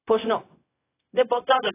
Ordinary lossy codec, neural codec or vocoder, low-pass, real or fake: AAC, 16 kbps; codec, 16 kHz, 0.4 kbps, LongCat-Audio-Codec; 3.6 kHz; fake